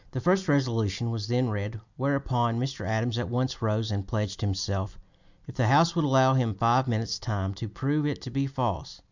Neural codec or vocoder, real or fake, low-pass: none; real; 7.2 kHz